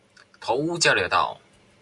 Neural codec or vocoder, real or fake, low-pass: none; real; 10.8 kHz